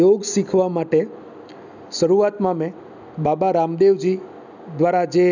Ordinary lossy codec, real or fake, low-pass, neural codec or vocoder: none; real; 7.2 kHz; none